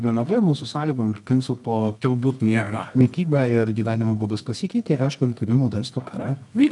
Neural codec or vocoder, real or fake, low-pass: codec, 24 kHz, 0.9 kbps, WavTokenizer, medium music audio release; fake; 10.8 kHz